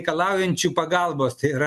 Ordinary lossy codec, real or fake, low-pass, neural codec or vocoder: MP3, 64 kbps; real; 14.4 kHz; none